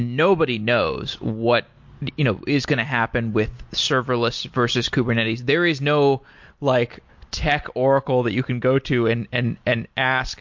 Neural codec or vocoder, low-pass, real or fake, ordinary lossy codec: none; 7.2 kHz; real; MP3, 48 kbps